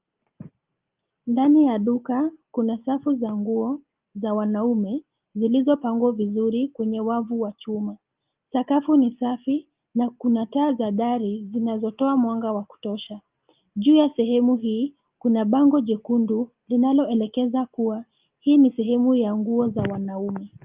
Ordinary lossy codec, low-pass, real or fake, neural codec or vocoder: Opus, 32 kbps; 3.6 kHz; real; none